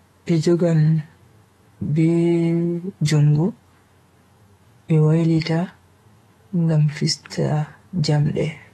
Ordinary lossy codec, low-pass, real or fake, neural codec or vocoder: AAC, 32 kbps; 19.8 kHz; fake; autoencoder, 48 kHz, 128 numbers a frame, DAC-VAE, trained on Japanese speech